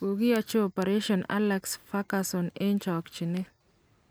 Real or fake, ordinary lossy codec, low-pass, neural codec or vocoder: real; none; none; none